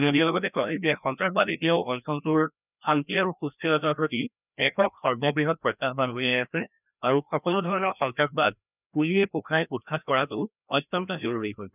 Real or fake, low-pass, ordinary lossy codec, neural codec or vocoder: fake; 3.6 kHz; none; codec, 16 kHz, 1 kbps, FreqCodec, larger model